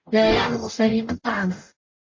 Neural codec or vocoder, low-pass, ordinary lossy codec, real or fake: codec, 44.1 kHz, 0.9 kbps, DAC; 7.2 kHz; MP3, 32 kbps; fake